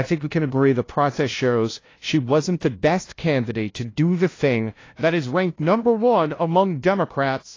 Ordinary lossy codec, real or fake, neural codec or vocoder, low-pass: AAC, 32 kbps; fake; codec, 16 kHz, 0.5 kbps, FunCodec, trained on LibriTTS, 25 frames a second; 7.2 kHz